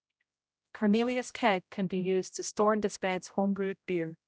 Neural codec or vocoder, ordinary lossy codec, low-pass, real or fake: codec, 16 kHz, 0.5 kbps, X-Codec, HuBERT features, trained on general audio; none; none; fake